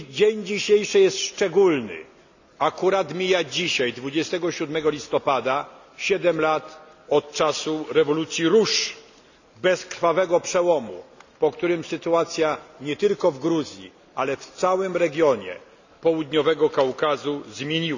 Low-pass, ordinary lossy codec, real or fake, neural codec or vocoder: 7.2 kHz; none; real; none